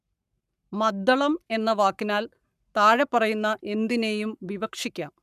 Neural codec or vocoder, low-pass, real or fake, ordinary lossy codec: codec, 44.1 kHz, 7.8 kbps, Pupu-Codec; 14.4 kHz; fake; none